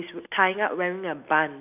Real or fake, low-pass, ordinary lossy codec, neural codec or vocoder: real; 3.6 kHz; none; none